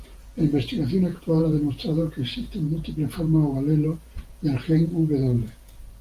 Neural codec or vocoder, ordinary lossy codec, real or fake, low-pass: vocoder, 48 kHz, 128 mel bands, Vocos; Opus, 32 kbps; fake; 14.4 kHz